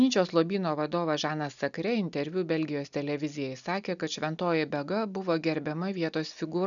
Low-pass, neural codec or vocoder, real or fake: 7.2 kHz; none; real